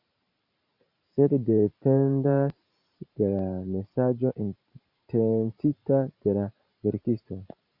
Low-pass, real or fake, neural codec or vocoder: 5.4 kHz; fake; vocoder, 44.1 kHz, 128 mel bands every 512 samples, BigVGAN v2